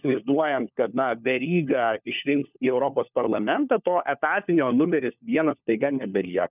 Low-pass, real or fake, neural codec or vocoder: 3.6 kHz; fake; codec, 16 kHz, 4 kbps, FunCodec, trained on LibriTTS, 50 frames a second